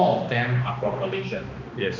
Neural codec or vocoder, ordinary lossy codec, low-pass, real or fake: codec, 16 kHz, 2 kbps, X-Codec, HuBERT features, trained on balanced general audio; none; 7.2 kHz; fake